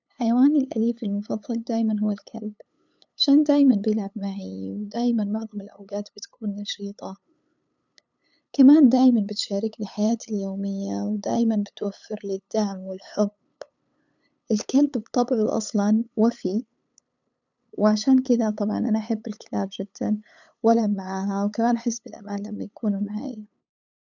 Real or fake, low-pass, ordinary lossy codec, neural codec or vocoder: fake; 7.2 kHz; none; codec, 16 kHz, 8 kbps, FunCodec, trained on LibriTTS, 25 frames a second